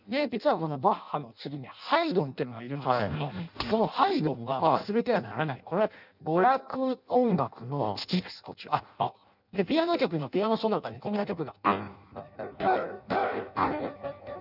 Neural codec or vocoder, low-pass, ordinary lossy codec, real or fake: codec, 16 kHz in and 24 kHz out, 0.6 kbps, FireRedTTS-2 codec; 5.4 kHz; none; fake